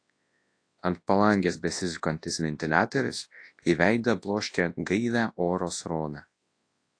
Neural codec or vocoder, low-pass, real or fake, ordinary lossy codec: codec, 24 kHz, 0.9 kbps, WavTokenizer, large speech release; 9.9 kHz; fake; AAC, 48 kbps